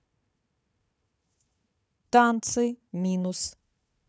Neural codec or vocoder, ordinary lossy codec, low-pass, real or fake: codec, 16 kHz, 4 kbps, FunCodec, trained on Chinese and English, 50 frames a second; none; none; fake